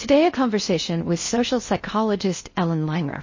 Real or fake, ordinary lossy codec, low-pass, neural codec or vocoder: fake; MP3, 32 kbps; 7.2 kHz; codec, 16 kHz in and 24 kHz out, 0.6 kbps, FocalCodec, streaming, 4096 codes